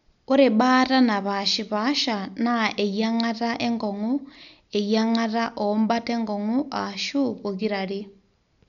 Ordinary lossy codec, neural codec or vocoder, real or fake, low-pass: none; none; real; 7.2 kHz